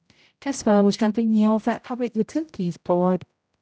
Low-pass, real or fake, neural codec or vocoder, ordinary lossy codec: none; fake; codec, 16 kHz, 0.5 kbps, X-Codec, HuBERT features, trained on general audio; none